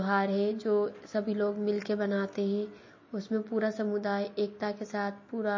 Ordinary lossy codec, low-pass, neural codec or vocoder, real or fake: MP3, 32 kbps; 7.2 kHz; none; real